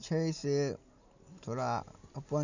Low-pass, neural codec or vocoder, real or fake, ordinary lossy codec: 7.2 kHz; none; real; none